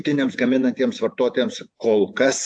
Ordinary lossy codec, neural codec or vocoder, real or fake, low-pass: AAC, 64 kbps; vocoder, 48 kHz, 128 mel bands, Vocos; fake; 9.9 kHz